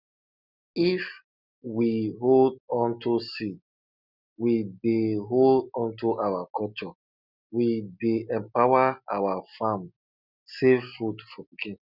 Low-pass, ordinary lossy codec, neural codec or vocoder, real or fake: 5.4 kHz; none; none; real